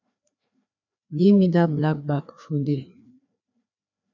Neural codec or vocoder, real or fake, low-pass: codec, 16 kHz, 2 kbps, FreqCodec, larger model; fake; 7.2 kHz